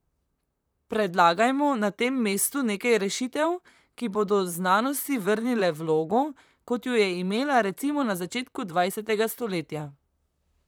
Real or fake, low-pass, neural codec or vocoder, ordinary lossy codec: fake; none; vocoder, 44.1 kHz, 128 mel bands, Pupu-Vocoder; none